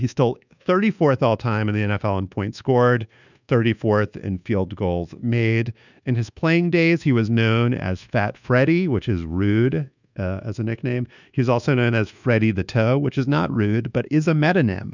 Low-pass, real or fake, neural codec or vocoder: 7.2 kHz; fake; codec, 24 kHz, 1.2 kbps, DualCodec